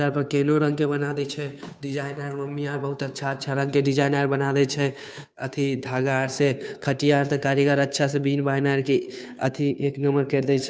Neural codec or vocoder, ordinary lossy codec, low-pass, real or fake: codec, 16 kHz, 2 kbps, FunCodec, trained on Chinese and English, 25 frames a second; none; none; fake